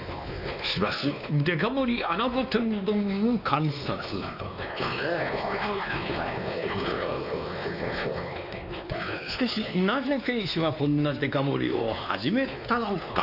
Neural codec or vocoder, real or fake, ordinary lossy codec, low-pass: codec, 16 kHz, 2 kbps, X-Codec, WavLM features, trained on Multilingual LibriSpeech; fake; none; 5.4 kHz